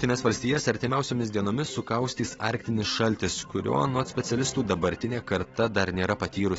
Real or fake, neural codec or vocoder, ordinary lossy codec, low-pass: real; none; AAC, 24 kbps; 19.8 kHz